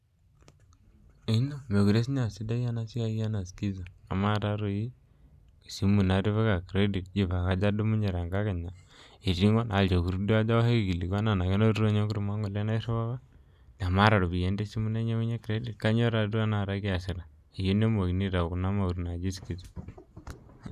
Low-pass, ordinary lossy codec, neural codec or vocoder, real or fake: 14.4 kHz; none; none; real